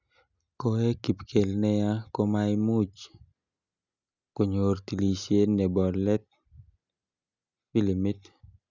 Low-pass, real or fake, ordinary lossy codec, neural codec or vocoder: 7.2 kHz; real; none; none